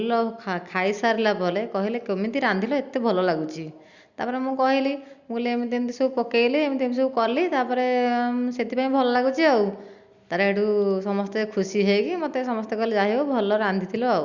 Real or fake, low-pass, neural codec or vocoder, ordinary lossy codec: real; 7.2 kHz; none; Opus, 64 kbps